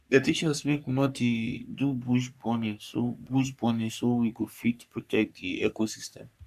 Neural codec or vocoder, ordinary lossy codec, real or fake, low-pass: codec, 44.1 kHz, 3.4 kbps, Pupu-Codec; none; fake; 14.4 kHz